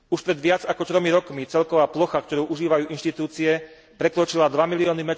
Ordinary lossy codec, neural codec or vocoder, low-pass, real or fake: none; none; none; real